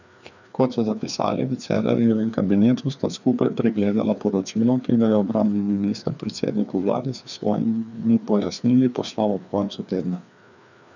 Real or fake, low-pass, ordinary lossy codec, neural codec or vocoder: fake; 7.2 kHz; none; codec, 16 kHz, 2 kbps, FreqCodec, larger model